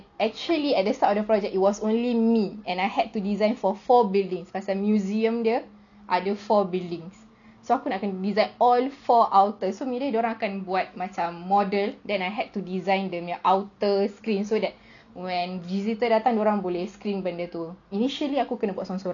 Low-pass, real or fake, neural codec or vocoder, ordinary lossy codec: 7.2 kHz; real; none; none